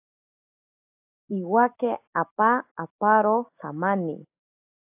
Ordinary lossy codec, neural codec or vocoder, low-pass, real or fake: AAC, 32 kbps; none; 3.6 kHz; real